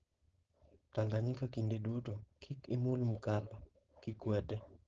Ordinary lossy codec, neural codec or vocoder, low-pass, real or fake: Opus, 16 kbps; codec, 16 kHz, 4.8 kbps, FACodec; 7.2 kHz; fake